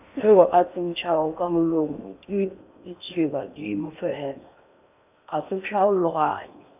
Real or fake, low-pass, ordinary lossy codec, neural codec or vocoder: fake; 3.6 kHz; none; codec, 16 kHz in and 24 kHz out, 0.8 kbps, FocalCodec, streaming, 65536 codes